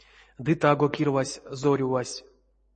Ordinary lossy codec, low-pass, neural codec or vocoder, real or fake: MP3, 32 kbps; 9.9 kHz; none; real